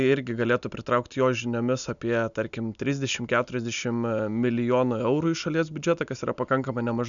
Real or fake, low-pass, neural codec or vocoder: real; 7.2 kHz; none